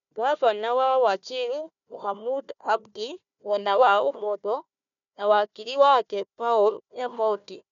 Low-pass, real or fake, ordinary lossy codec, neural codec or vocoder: 7.2 kHz; fake; none; codec, 16 kHz, 1 kbps, FunCodec, trained on Chinese and English, 50 frames a second